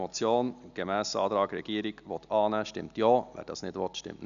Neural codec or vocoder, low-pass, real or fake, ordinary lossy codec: none; 7.2 kHz; real; none